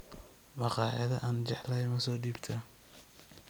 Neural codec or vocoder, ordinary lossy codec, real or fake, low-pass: none; none; real; none